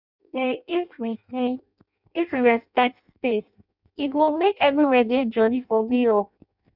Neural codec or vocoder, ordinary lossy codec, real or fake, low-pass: codec, 16 kHz in and 24 kHz out, 0.6 kbps, FireRedTTS-2 codec; none; fake; 5.4 kHz